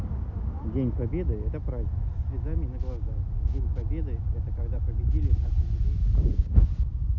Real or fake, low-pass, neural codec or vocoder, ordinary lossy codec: real; 7.2 kHz; none; none